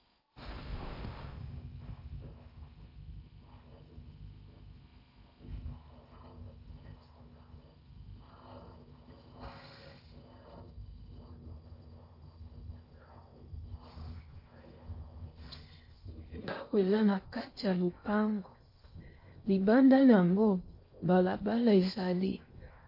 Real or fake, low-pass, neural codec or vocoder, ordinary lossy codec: fake; 5.4 kHz; codec, 16 kHz in and 24 kHz out, 0.6 kbps, FocalCodec, streaming, 4096 codes; MP3, 32 kbps